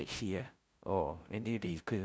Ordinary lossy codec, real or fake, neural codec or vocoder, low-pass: none; fake; codec, 16 kHz, 0.5 kbps, FunCodec, trained on LibriTTS, 25 frames a second; none